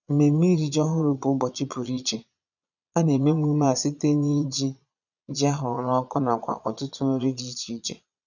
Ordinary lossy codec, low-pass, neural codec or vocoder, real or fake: none; 7.2 kHz; vocoder, 22.05 kHz, 80 mel bands, Vocos; fake